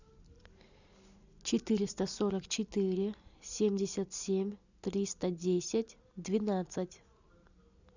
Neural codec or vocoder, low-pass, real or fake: none; 7.2 kHz; real